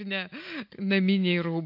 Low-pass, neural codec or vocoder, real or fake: 5.4 kHz; none; real